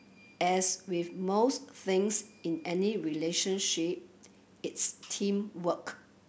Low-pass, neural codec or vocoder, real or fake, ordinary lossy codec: none; none; real; none